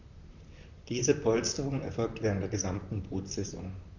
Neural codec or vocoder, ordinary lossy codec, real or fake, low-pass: vocoder, 44.1 kHz, 128 mel bands, Pupu-Vocoder; none; fake; 7.2 kHz